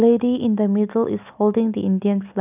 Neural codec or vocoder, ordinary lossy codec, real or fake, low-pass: none; none; real; 3.6 kHz